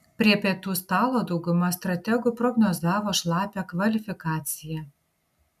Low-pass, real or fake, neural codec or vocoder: 14.4 kHz; real; none